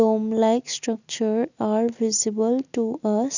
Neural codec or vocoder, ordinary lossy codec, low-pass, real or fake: none; none; 7.2 kHz; real